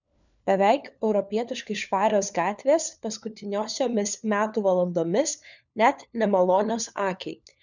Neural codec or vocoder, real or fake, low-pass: codec, 16 kHz, 4 kbps, FunCodec, trained on LibriTTS, 50 frames a second; fake; 7.2 kHz